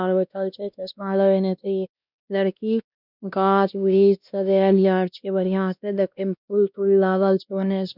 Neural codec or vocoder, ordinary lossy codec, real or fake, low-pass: codec, 16 kHz, 1 kbps, X-Codec, WavLM features, trained on Multilingual LibriSpeech; none; fake; 5.4 kHz